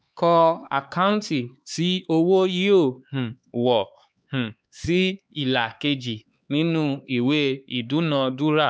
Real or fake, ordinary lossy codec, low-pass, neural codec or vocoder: fake; none; none; codec, 16 kHz, 4 kbps, X-Codec, HuBERT features, trained on LibriSpeech